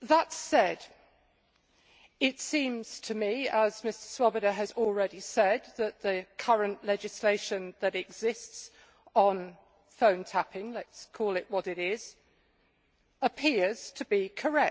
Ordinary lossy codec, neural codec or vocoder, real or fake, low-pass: none; none; real; none